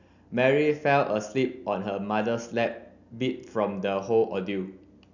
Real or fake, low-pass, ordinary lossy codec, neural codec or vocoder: real; 7.2 kHz; none; none